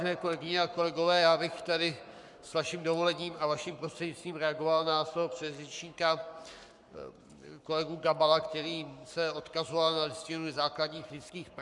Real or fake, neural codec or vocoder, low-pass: fake; codec, 44.1 kHz, 7.8 kbps, Pupu-Codec; 10.8 kHz